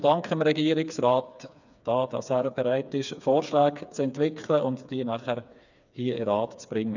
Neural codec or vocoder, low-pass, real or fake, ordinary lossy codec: codec, 16 kHz, 4 kbps, FreqCodec, smaller model; 7.2 kHz; fake; none